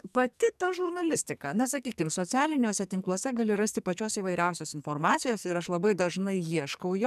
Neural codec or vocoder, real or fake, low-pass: codec, 44.1 kHz, 2.6 kbps, SNAC; fake; 14.4 kHz